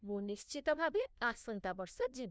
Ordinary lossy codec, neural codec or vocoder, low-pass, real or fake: none; codec, 16 kHz, 1 kbps, FunCodec, trained on LibriTTS, 50 frames a second; none; fake